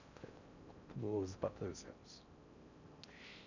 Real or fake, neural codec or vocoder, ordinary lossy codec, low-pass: fake; codec, 16 kHz in and 24 kHz out, 0.6 kbps, FocalCodec, streaming, 4096 codes; none; 7.2 kHz